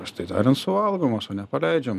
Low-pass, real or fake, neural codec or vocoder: 14.4 kHz; real; none